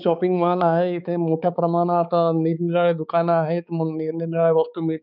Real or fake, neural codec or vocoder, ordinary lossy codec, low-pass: fake; codec, 16 kHz, 4 kbps, X-Codec, HuBERT features, trained on balanced general audio; none; 5.4 kHz